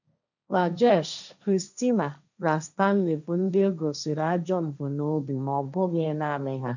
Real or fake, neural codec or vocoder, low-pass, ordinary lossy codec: fake; codec, 16 kHz, 1.1 kbps, Voila-Tokenizer; 7.2 kHz; none